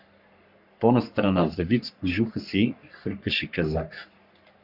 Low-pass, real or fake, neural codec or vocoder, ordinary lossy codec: 5.4 kHz; fake; codec, 44.1 kHz, 3.4 kbps, Pupu-Codec; Opus, 64 kbps